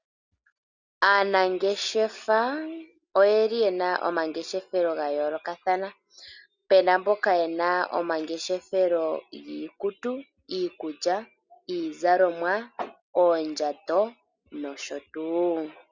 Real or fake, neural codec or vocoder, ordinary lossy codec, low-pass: real; none; Opus, 64 kbps; 7.2 kHz